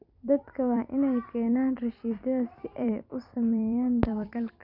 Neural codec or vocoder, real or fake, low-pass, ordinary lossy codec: vocoder, 44.1 kHz, 128 mel bands every 256 samples, BigVGAN v2; fake; 5.4 kHz; none